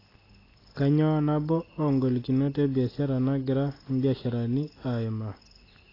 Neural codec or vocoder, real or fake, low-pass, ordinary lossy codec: none; real; 5.4 kHz; AAC, 24 kbps